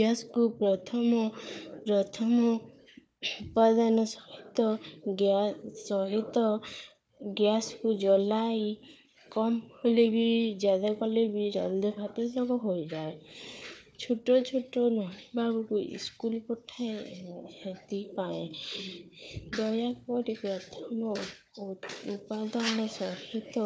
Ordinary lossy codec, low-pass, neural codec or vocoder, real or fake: none; none; codec, 16 kHz, 4 kbps, FunCodec, trained on Chinese and English, 50 frames a second; fake